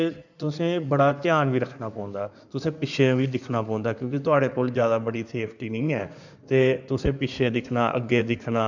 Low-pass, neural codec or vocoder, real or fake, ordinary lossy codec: 7.2 kHz; codec, 16 kHz in and 24 kHz out, 2.2 kbps, FireRedTTS-2 codec; fake; none